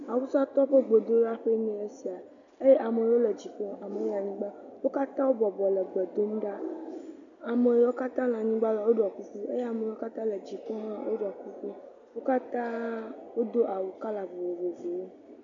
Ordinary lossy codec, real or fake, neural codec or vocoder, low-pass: MP3, 64 kbps; real; none; 7.2 kHz